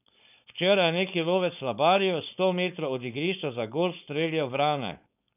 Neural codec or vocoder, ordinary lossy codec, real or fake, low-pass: codec, 16 kHz, 4.8 kbps, FACodec; none; fake; 3.6 kHz